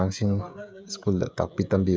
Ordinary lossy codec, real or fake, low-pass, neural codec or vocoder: none; fake; none; codec, 16 kHz, 16 kbps, FreqCodec, smaller model